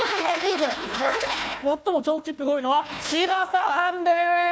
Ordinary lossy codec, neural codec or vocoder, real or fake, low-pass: none; codec, 16 kHz, 1 kbps, FunCodec, trained on Chinese and English, 50 frames a second; fake; none